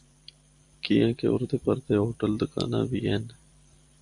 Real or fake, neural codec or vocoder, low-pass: fake; vocoder, 44.1 kHz, 128 mel bands every 512 samples, BigVGAN v2; 10.8 kHz